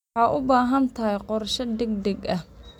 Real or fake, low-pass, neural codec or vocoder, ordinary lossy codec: real; 19.8 kHz; none; none